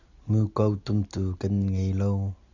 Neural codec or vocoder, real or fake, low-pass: none; real; 7.2 kHz